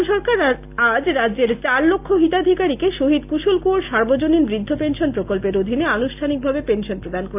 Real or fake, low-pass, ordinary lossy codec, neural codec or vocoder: real; 3.6 kHz; AAC, 32 kbps; none